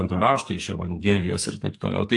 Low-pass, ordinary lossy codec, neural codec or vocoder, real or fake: 10.8 kHz; AAC, 64 kbps; codec, 44.1 kHz, 2.6 kbps, SNAC; fake